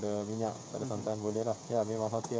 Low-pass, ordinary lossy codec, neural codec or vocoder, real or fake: none; none; codec, 16 kHz, 16 kbps, FreqCodec, smaller model; fake